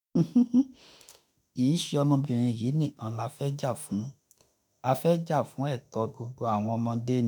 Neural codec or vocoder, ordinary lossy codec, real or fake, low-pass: autoencoder, 48 kHz, 32 numbers a frame, DAC-VAE, trained on Japanese speech; none; fake; none